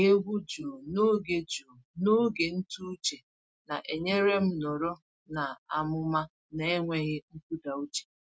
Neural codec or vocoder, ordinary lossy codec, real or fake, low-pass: none; none; real; none